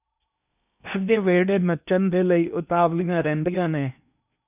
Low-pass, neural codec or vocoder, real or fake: 3.6 kHz; codec, 16 kHz in and 24 kHz out, 0.8 kbps, FocalCodec, streaming, 65536 codes; fake